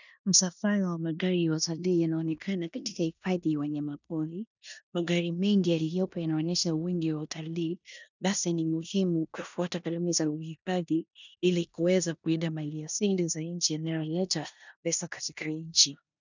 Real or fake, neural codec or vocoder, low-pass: fake; codec, 16 kHz in and 24 kHz out, 0.9 kbps, LongCat-Audio-Codec, four codebook decoder; 7.2 kHz